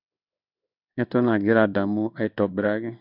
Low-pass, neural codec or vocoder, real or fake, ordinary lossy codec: 5.4 kHz; codec, 16 kHz in and 24 kHz out, 1 kbps, XY-Tokenizer; fake; none